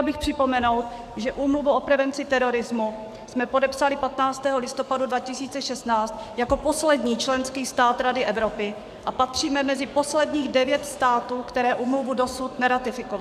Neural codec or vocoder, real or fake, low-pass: codec, 44.1 kHz, 7.8 kbps, DAC; fake; 14.4 kHz